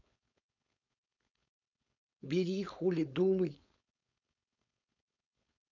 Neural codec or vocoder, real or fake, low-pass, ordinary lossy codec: codec, 16 kHz, 4.8 kbps, FACodec; fake; 7.2 kHz; none